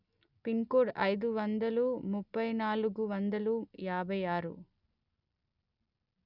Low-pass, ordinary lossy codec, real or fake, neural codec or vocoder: 5.4 kHz; none; real; none